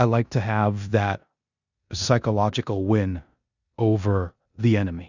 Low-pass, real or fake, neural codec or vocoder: 7.2 kHz; fake; codec, 16 kHz in and 24 kHz out, 0.9 kbps, LongCat-Audio-Codec, four codebook decoder